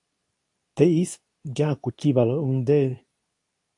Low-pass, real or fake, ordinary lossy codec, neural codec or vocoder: 10.8 kHz; fake; AAC, 64 kbps; codec, 24 kHz, 0.9 kbps, WavTokenizer, medium speech release version 2